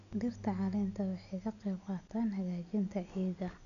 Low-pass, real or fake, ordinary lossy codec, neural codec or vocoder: 7.2 kHz; real; none; none